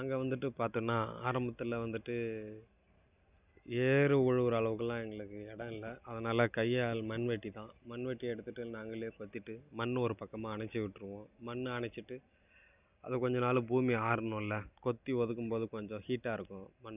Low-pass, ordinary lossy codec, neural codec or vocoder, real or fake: 3.6 kHz; none; none; real